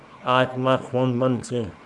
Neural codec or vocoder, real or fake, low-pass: codec, 24 kHz, 0.9 kbps, WavTokenizer, small release; fake; 10.8 kHz